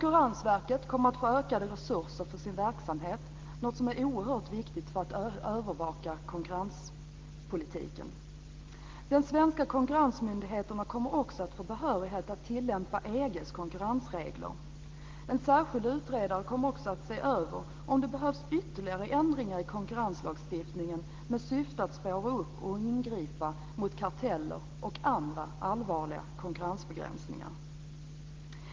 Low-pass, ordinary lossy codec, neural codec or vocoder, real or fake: 7.2 kHz; Opus, 16 kbps; none; real